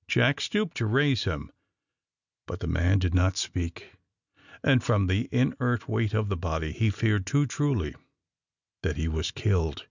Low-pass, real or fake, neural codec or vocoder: 7.2 kHz; real; none